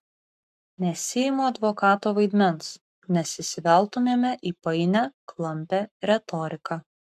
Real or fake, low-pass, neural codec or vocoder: fake; 14.4 kHz; codec, 44.1 kHz, 7.8 kbps, Pupu-Codec